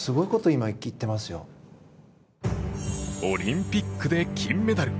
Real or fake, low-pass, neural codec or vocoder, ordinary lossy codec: real; none; none; none